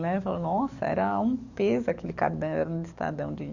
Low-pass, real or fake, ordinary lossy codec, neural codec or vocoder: 7.2 kHz; fake; Opus, 64 kbps; codec, 44.1 kHz, 7.8 kbps, DAC